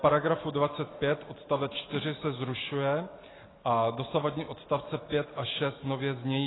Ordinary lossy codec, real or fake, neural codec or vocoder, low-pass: AAC, 16 kbps; real; none; 7.2 kHz